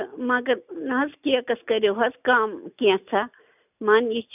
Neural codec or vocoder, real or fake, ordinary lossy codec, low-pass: none; real; none; 3.6 kHz